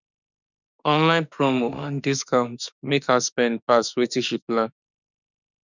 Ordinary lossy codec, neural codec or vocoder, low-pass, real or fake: none; autoencoder, 48 kHz, 32 numbers a frame, DAC-VAE, trained on Japanese speech; 7.2 kHz; fake